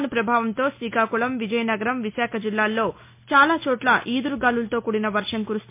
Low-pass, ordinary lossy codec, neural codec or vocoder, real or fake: 3.6 kHz; MP3, 24 kbps; none; real